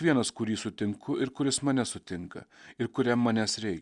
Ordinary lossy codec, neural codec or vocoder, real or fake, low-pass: Opus, 64 kbps; none; real; 10.8 kHz